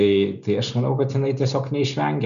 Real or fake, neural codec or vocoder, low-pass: real; none; 7.2 kHz